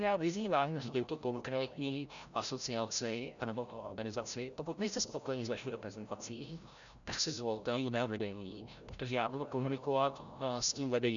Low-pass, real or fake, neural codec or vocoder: 7.2 kHz; fake; codec, 16 kHz, 0.5 kbps, FreqCodec, larger model